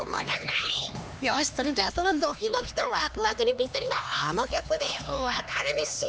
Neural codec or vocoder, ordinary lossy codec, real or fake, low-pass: codec, 16 kHz, 2 kbps, X-Codec, HuBERT features, trained on LibriSpeech; none; fake; none